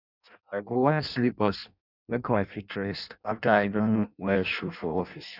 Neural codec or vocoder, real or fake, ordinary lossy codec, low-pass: codec, 16 kHz in and 24 kHz out, 0.6 kbps, FireRedTTS-2 codec; fake; none; 5.4 kHz